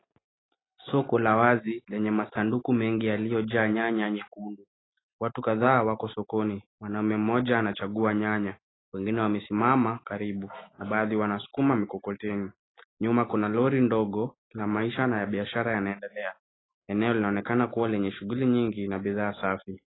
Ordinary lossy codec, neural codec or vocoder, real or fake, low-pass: AAC, 16 kbps; none; real; 7.2 kHz